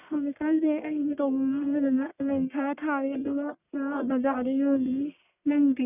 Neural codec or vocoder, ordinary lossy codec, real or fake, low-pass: codec, 44.1 kHz, 1.7 kbps, Pupu-Codec; none; fake; 3.6 kHz